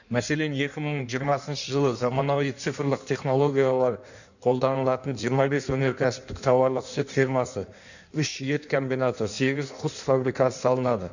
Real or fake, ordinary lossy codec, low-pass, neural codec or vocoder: fake; none; 7.2 kHz; codec, 16 kHz in and 24 kHz out, 1.1 kbps, FireRedTTS-2 codec